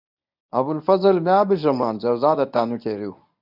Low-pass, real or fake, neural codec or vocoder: 5.4 kHz; fake; codec, 24 kHz, 0.9 kbps, WavTokenizer, medium speech release version 1